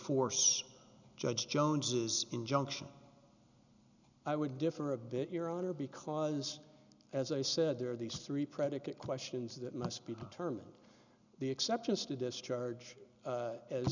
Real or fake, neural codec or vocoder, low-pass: real; none; 7.2 kHz